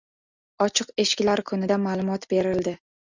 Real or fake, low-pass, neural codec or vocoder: real; 7.2 kHz; none